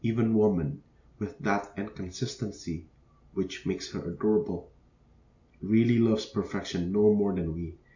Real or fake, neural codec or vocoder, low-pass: real; none; 7.2 kHz